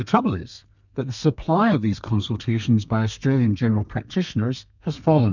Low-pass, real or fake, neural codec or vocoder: 7.2 kHz; fake; codec, 32 kHz, 1.9 kbps, SNAC